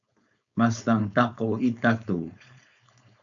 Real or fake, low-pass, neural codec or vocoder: fake; 7.2 kHz; codec, 16 kHz, 4.8 kbps, FACodec